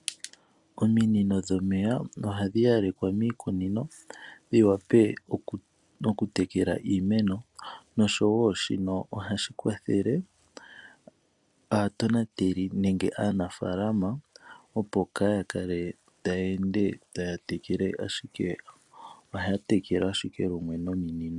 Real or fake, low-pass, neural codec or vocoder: real; 10.8 kHz; none